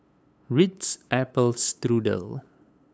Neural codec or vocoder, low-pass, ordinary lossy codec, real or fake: codec, 16 kHz, 8 kbps, FunCodec, trained on LibriTTS, 25 frames a second; none; none; fake